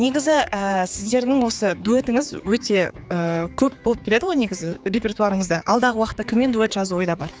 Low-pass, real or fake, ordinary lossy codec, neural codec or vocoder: none; fake; none; codec, 16 kHz, 4 kbps, X-Codec, HuBERT features, trained on general audio